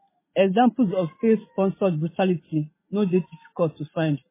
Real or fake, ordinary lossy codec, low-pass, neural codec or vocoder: real; MP3, 16 kbps; 3.6 kHz; none